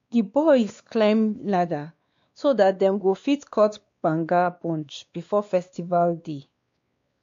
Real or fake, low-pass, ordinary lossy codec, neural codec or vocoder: fake; 7.2 kHz; MP3, 48 kbps; codec, 16 kHz, 2 kbps, X-Codec, WavLM features, trained on Multilingual LibriSpeech